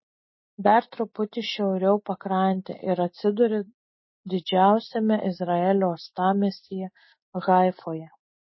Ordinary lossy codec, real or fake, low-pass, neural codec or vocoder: MP3, 24 kbps; real; 7.2 kHz; none